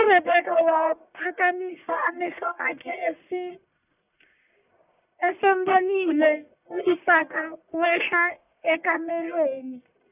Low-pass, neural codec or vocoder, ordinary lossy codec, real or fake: 3.6 kHz; codec, 44.1 kHz, 1.7 kbps, Pupu-Codec; none; fake